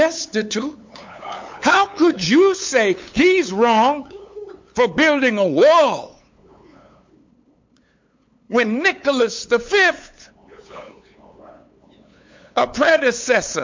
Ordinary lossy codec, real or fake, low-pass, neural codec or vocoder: MP3, 48 kbps; fake; 7.2 kHz; codec, 16 kHz, 8 kbps, FunCodec, trained on LibriTTS, 25 frames a second